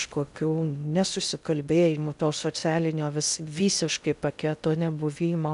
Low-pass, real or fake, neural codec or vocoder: 10.8 kHz; fake; codec, 16 kHz in and 24 kHz out, 0.6 kbps, FocalCodec, streaming, 2048 codes